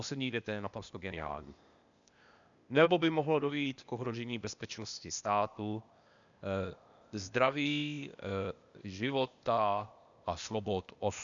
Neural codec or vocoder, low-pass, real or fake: codec, 16 kHz, 0.8 kbps, ZipCodec; 7.2 kHz; fake